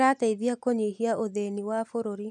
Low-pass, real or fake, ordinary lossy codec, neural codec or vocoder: none; real; none; none